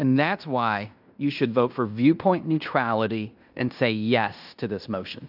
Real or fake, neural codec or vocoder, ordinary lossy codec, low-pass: fake; codec, 16 kHz in and 24 kHz out, 0.9 kbps, LongCat-Audio-Codec, fine tuned four codebook decoder; AAC, 48 kbps; 5.4 kHz